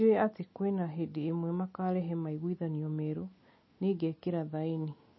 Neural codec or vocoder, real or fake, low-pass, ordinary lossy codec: none; real; 7.2 kHz; MP3, 24 kbps